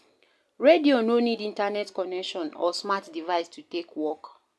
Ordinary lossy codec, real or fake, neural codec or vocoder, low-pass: none; real; none; none